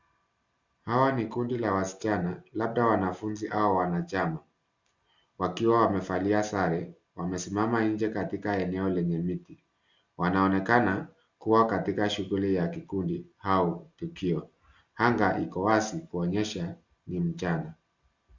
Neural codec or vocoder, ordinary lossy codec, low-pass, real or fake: none; Opus, 64 kbps; 7.2 kHz; real